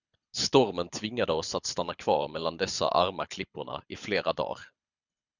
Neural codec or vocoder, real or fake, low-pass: codec, 24 kHz, 6 kbps, HILCodec; fake; 7.2 kHz